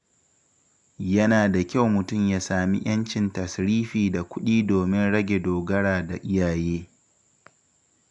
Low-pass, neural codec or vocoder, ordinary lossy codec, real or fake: 10.8 kHz; none; none; real